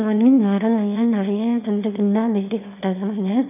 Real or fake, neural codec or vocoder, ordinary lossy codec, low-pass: fake; autoencoder, 22.05 kHz, a latent of 192 numbers a frame, VITS, trained on one speaker; none; 3.6 kHz